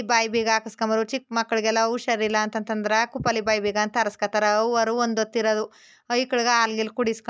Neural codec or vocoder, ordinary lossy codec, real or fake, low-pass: none; none; real; none